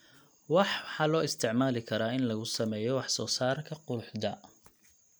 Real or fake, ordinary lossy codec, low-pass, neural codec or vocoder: real; none; none; none